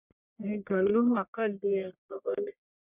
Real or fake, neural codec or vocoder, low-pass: fake; codec, 44.1 kHz, 1.7 kbps, Pupu-Codec; 3.6 kHz